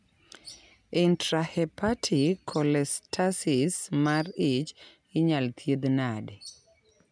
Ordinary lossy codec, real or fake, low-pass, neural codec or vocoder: none; real; 9.9 kHz; none